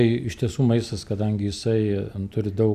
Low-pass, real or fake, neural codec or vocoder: 14.4 kHz; real; none